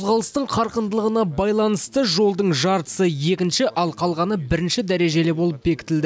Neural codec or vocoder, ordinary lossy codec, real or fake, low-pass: none; none; real; none